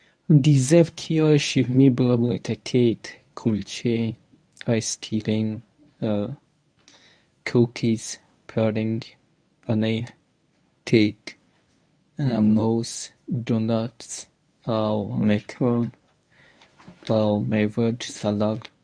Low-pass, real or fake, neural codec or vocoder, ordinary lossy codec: 9.9 kHz; fake; codec, 24 kHz, 0.9 kbps, WavTokenizer, medium speech release version 1; none